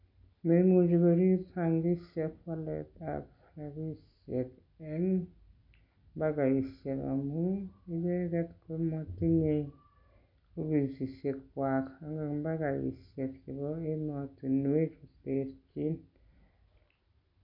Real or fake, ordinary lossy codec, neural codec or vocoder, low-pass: real; none; none; 5.4 kHz